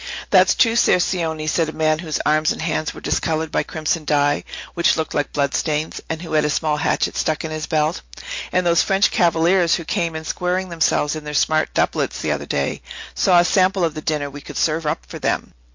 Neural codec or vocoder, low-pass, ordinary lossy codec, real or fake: none; 7.2 kHz; MP3, 48 kbps; real